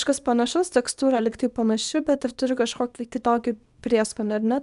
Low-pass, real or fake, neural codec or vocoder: 10.8 kHz; fake; codec, 24 kHz, 0.9 kbps, WavTokenizer, small release